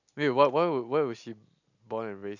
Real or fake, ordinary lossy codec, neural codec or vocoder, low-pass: real; none; none; 7.2 kHz